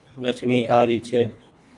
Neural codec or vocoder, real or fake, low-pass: codec, 24 kHz, 1.5 kbps, HILCodec; fake; 10.8 kHz